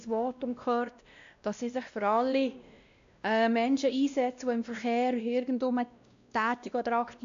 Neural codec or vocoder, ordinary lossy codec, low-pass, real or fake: codec, 16 kHz, 1 kbps, X-Codec, WavLM features, trained on Multilingual LibriSpeech; AAC, 64 kbps; 7.2 kHz; fake